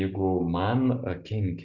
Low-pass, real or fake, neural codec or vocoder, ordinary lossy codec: 7.2 kHz; real; none; Opus, 64 kbps